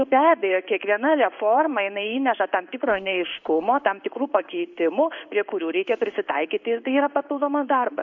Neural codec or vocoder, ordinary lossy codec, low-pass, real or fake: codec, 16 kHz in and 24 kHz out, 1 kbps, XY-Tokenizer; MP3, 48 kbps; 7.2 kHz; fake